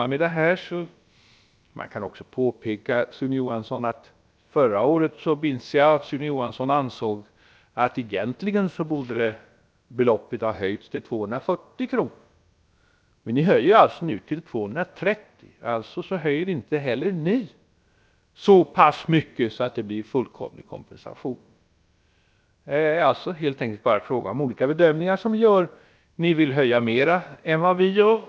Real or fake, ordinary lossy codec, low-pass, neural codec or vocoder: fake; none; none; codec, 16 kHz, about 1 kbps, DyCAST, with the encoder's durations